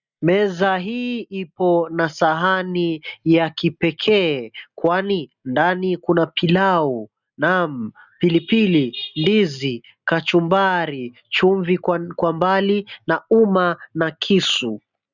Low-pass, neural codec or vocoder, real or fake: 7.2 kHz; none; real